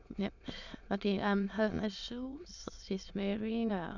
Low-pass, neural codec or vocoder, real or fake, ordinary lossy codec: 7.2 kHz; autoencoder, 22.05 kHz, a latent of 192 numbers a frame, VITS, trained on many speakers; fake; none